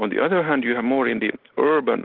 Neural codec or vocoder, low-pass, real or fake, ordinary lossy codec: none; 5.4 kHz; real; Opus, 16 kbps